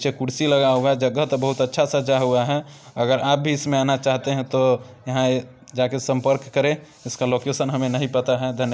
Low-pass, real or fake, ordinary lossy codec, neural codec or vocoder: none; real; none; none